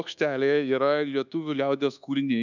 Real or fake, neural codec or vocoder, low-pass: fake; codec, 24 kHz, 1.2 kbps, DualCodec; 7.2 kHz